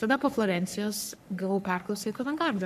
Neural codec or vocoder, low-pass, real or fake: codec, 44.1 kHz, 3.4 kbps, Pupu-Codec; 14.4 kHz; fake